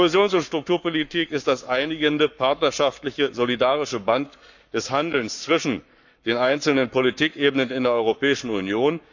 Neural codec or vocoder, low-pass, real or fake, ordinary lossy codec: codec, 44.1 kHz, 7.8 kbps, Pupu-Codec; 7.2 kHz; fake; none